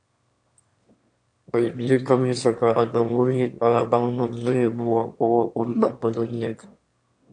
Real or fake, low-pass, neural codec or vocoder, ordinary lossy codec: fake; 9.9 kHz; autoencoder, 22.05 kHz, a latent of 192 numbers a frame, VITS, trained on one speaker; AAC, 64 kbps